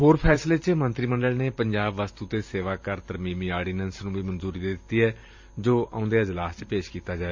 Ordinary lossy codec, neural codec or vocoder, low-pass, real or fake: MP3, 64 kbps; vocoder, 44.1 kHz, 128 mel bands every 512 samples, BigVGAN v2; 7.2 kHz; fake